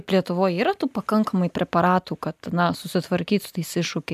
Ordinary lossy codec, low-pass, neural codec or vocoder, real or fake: AAC, 96 kbps; 14.4 kHz; none; real